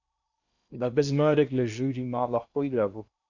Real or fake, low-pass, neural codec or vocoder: fake; 7.2 kHz; codec, 16 kHz in and 24 kHz out, 0.8 kbps, FocalCodec, streaming, 65536 codes